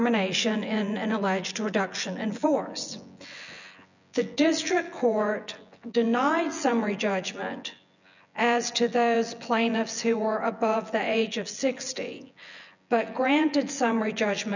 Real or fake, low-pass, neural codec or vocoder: fake; 7.2 kHz; vocoder, 24 kHz, 100 mel bands, Vocos